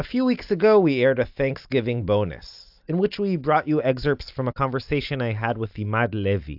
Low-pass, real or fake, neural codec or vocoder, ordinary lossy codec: 5.4 kHz; real; none; AAC, 48 kbps